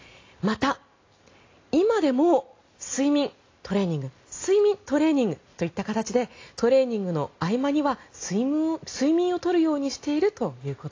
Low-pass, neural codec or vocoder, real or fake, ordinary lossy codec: 7.2 kHz; none; real; AAC, 32 kbps